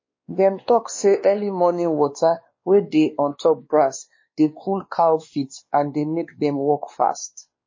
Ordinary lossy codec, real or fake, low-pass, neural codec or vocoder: MP3, 32 kbps; fake; 7.2 kHz; codec, 16 kHz, 2 kbps, X-Codec, WavLM features, trained on Multilingual LibriSpeech